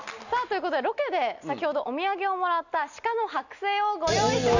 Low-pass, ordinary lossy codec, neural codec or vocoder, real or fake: 7.2 kHz; none; none; real